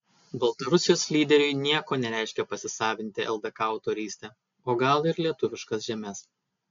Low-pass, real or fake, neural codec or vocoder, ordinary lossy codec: 7.2 kHz; real; none; MP3, 64 kbps